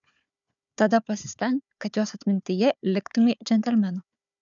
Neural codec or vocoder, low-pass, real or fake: codec, 16 kHz, 4 kbps, FunCodec, trained on Chinese and English, 50 frames a second; 7.2 kHz; fake